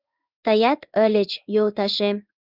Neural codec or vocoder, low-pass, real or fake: codec, 16 kHz in and 24 kHz out, 1 kbps, XY-Tokenizer; 5.4 kHz; fake